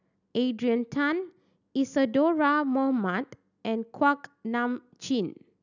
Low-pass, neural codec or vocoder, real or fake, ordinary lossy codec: 7.2 kHz; none; real; none